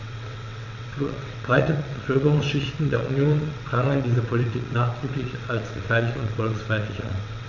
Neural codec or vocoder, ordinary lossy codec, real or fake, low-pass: vocoder, 22.05 kHz, 80 mel bands, WaveNeXt; none; fake; 7.2 kHz